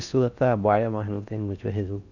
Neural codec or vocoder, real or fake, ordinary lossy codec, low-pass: codec, 16 kHz in and 24 kHz out, 0.6 kbps, FocalCodec, streaming, 4096 codes; fake; none; 7.2 kHz